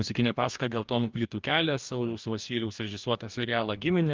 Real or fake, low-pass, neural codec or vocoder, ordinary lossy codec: fake; 7.2 kHz; codec, 44.1 kHz, 2.6 kbps, DAC; Opus, 24 kbps